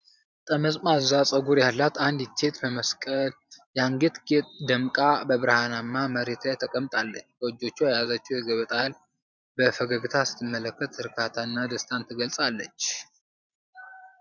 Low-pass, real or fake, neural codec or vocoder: 7.2 kHz; fake; vocoder, 44.1 kHz, 128 mel bands every 512 samples, BigVGAN v2